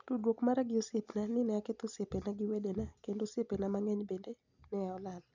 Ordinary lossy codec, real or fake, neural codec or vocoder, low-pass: none; real; none; 7.2 kHz